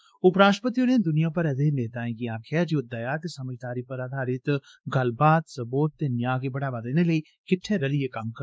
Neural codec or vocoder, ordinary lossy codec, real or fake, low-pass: codec, 16 kHz, 2 kbps, X-Codec, WavLM features, trained on Multilingual LibriSpeech; none; fake; none